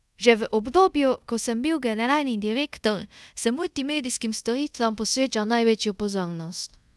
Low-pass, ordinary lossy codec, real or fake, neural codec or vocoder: none; none; fake; codec, 24 kHz, 0.5 kbps, DualCodec